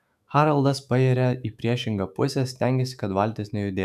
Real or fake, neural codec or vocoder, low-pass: fake; autoencoder, 48 kHz, 128 numbers a frame, DAC-VAE, trained on Japanese speech; 14.4 kHz